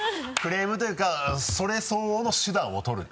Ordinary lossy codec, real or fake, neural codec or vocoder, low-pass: none; real; none; none